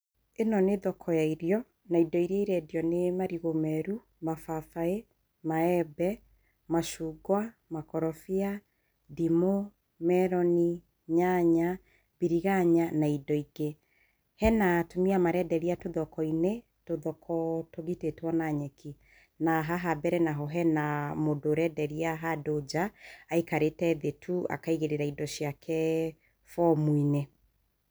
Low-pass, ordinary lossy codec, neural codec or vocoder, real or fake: none; none; none; real